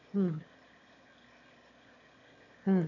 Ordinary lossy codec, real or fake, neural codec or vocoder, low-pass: none; fake; autoencoder, 22.05 kHz, a latent of 192 numbers a frame, VITS, trained on one speaker; 7.2 kHz